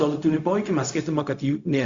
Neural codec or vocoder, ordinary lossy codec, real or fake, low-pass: codec, 16 kHz, 0.4 kbps, LongCat-Audio-Codec; Opus, 64 kbps; fake; 7.2 kHz